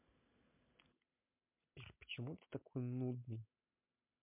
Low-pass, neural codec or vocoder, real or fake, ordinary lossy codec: 3.6 kHz; none; real; MP3, 32 kbps